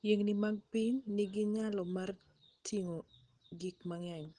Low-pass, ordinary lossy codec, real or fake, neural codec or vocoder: 9.9 kHz; Opus, 16 kbps; real; none